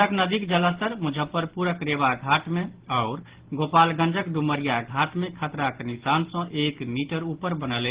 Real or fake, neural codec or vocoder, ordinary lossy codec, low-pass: real; none; Opus, 16 kbps; 3.6 kHz